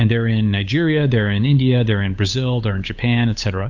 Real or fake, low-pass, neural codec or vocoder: fake; 7.2 kHz; codec, 16 kHz, 8 kbps, FunCodec, trained on Chinese and English, 25 frames a second